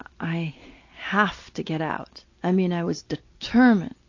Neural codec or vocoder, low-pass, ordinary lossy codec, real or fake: none; 7.2 kHz; AAC, 48 kbps; real